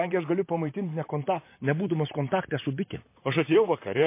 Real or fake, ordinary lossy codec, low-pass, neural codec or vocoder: fake; MP3, 24 kbps; 3.6 kHz; vocoder, 44.1 kHz, 128 mel bands, Pupu-Vocoder